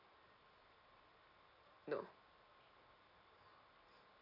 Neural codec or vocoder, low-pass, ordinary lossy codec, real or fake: none; 5.4 kHz; none; real